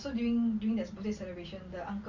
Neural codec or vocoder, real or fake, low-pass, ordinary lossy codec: none; real; 7.2 kHz; Opus, 64 kbps